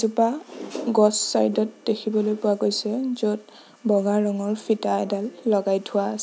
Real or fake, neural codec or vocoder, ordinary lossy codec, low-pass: real; none; none; none